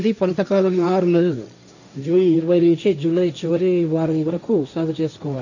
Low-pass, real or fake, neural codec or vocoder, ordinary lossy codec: 7.2 kHz; fake; codec, 16 kHz, 1.1 kbps, Voila-Tokenizer; none